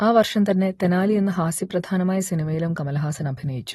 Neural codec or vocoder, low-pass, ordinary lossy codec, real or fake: none; 14.4 kHz; AAC, 32 kbps; real